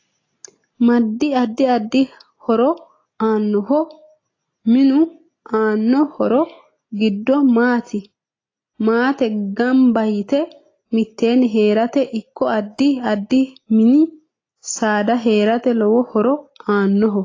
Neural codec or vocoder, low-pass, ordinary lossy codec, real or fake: none; 7.2 kHz; AAC, 32 kbps; real